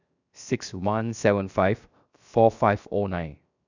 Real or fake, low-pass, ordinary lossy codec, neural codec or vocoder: fake; 7.2 kHz; none; codec, 16 kHz, 0.7 kbps, FocalCodec